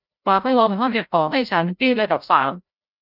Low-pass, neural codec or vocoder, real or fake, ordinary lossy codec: 5.4 kHz; codec, 16 kHz, 0.5 kbps, FreqCodec, larger model; fake; none